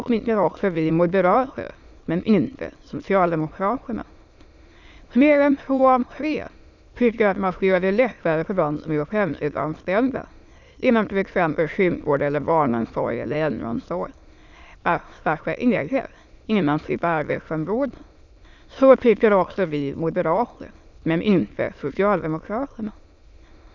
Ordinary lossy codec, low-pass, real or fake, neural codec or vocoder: none; 7.2 kHz; fake; autoencoder, 22.05 kHz, a latent of 192 numbers a frame, VITS, trained on many speakers